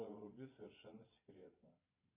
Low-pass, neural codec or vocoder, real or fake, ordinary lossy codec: 3.6 kHz; vocoder, 22.05 kHz, 80 mel bands, Vocos; fake; AAC, 24 kbps